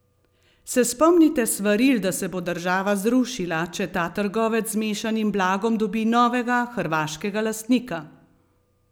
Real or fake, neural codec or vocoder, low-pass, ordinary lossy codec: real; none; none; none